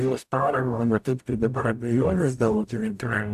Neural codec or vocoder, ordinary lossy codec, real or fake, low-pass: codec, 44.1 kHz, 0.9 kbps, DAC; AAC, 96 kbps; fake; 14.4 kHz